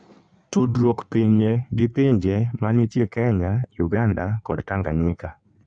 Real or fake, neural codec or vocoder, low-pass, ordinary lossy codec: fake; codec, 16 kHz in and 24 kHz out, 1.1 kbps, FireRedTTS-2 codec; 9.9 kHz; none